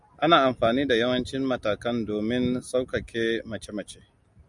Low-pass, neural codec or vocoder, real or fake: 10.8 kHz; none; real